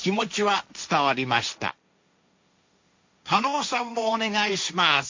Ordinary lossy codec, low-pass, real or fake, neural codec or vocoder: MP3, 48 kbps; 7.2 kHz; fake; codec, 16 kHz, 1.1 kbps, Voila-Tokenizer